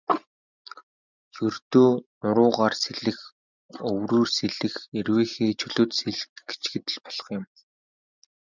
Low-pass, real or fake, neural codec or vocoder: 7.2 kHz; real; none